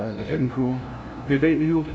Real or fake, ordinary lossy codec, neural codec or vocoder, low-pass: fake; none; codec, 16 kHz, 0.5 kbps, FunCodec, trained on LibriTTS, 25 frames a second; none